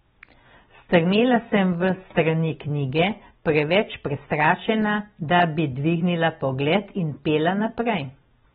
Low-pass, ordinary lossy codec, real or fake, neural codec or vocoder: 19.8 kHz; AAC, 16 kbps; real; none